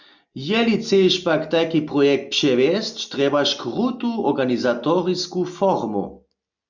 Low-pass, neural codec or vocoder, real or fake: 7.2 kHz; none; real